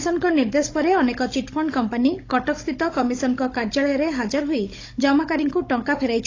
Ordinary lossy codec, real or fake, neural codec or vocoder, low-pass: AAC, 32 kbps; fake; codec, 16 kHz, 16 kbps, FunCodec, trained on Chinese and English, 50 frames a second; 7.2 kHz